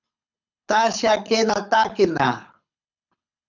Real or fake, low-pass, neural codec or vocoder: fake; 7.2 kHz; codec, 24 kHz, 6 kbps, HILCodec